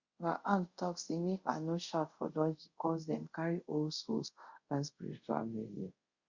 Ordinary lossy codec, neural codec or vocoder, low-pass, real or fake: Opus, 64 kbps; codec, 24 kHz, 0.5 kbps, DualCodec; 7.2 kHz; fake